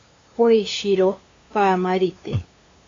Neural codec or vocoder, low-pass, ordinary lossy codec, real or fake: codec, 16 kHz, 2 kbps, FunCodec, trained on LibriTTS, 25 frames a second; 7.2 kHz; AAC, 32 kbps; fake